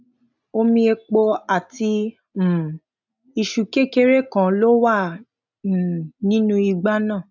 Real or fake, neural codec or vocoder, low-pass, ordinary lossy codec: real; none; 7.2 kHz; none